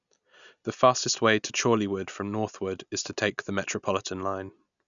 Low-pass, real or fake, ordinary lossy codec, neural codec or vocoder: 7.2 kHz; real; none; none